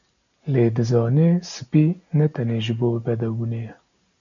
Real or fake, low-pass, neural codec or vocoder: real; 7.2 kHz; none